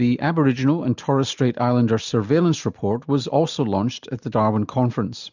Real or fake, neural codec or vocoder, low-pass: real; none; 7.2 kHz